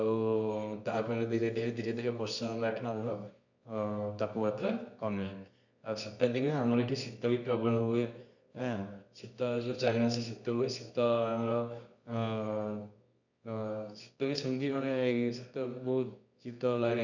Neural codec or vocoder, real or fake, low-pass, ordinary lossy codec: codec, 24 kHz, 0.9 kbps, WavTokenizer, medium music audio release; fake; 7.2 kHz; none